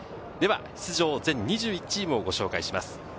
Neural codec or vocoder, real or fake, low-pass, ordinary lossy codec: none; real; none; none